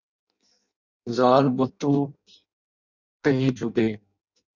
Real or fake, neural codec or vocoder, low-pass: fake; codec, 16 kHz in and 24 kHz out, 0.6 kbps, FireRedTTS-2 codec; 7.2 kHz